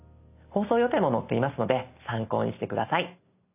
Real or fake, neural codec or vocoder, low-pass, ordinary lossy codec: real; none; 3.6 kHz; none